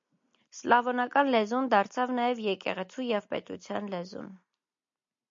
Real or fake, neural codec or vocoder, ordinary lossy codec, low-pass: real; none; MP3, 48 kbps; 7.2 kHz